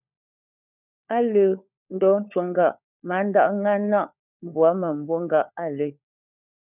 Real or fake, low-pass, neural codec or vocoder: fake; 3.6 kHz; codec, 16 kHz, 4 kbps, FunCodec, trained on LibriTTS, 50 frames a second